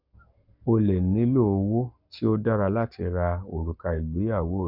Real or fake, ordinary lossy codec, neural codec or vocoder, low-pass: fake; none; codec, 44.1 kHz, 7.8 kbps, Pupu-Codec; 5.4 kHz